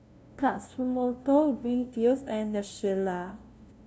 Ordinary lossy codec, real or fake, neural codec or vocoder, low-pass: none; fake; codec, 16 kHz, 0.5 kbps, FunCodec, trained on LibriTTS, 25 frames a second; none